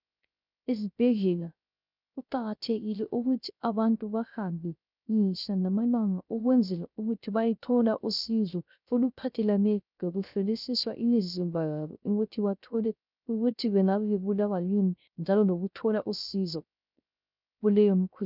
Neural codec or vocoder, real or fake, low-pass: codec, 16 kHz, 0.3 kbps, FocalCodec; fake; 5.4 kHz